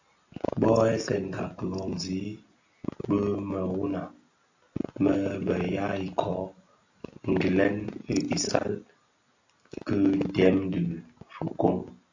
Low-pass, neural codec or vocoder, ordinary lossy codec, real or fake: 7.2 kHz; none; AAC, 48 kbps; real